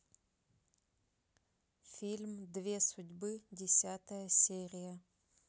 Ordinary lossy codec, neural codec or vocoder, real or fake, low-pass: none; none; real; none